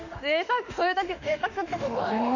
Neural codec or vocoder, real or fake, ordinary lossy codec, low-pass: autoencoder, 48 kHz, 32 numbers a frame, DAC-VAE, trained on Japanese speech; fake; none; 7.2 kHz